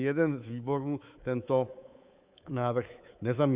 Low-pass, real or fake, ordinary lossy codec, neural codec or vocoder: 3.6 kHz; fake; Opus, 64 kbps; codec, 24 kHz, 3.1 kbps, DualCodec